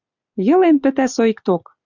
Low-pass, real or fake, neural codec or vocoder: 7.2 kHz; real; none